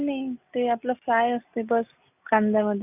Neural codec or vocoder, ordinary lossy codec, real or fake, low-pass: none; none; real; 3.6 kHz